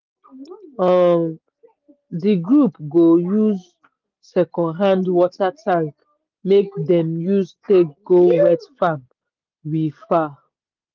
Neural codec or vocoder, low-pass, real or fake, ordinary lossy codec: none; 7.2 kHz; real; Opus, 32 kbps